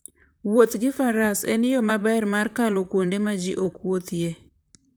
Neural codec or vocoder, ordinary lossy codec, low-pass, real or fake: vocoder, 44.1 kHz, 128 mel bands, Pupu-Vocoder; none; none; fake